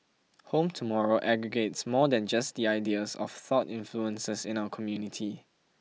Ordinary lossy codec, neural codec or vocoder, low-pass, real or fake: none; none; none; real